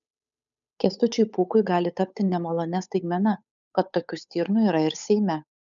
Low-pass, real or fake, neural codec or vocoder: 7.2 kHz; fake; codec, 16 kHz, 8 kbps, FunCodec, trained on Chinese and English, 25 frames a second